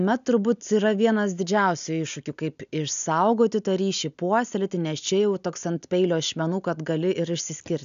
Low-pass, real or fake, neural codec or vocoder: 7.2 kHz; real; none